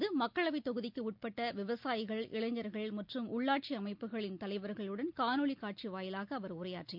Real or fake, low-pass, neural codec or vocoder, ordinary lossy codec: real; 5.4 kHz; none; MP3, 48 kbps